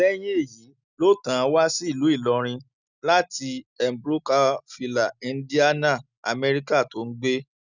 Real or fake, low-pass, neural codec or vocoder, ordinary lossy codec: real; 7.2 kHz; none; none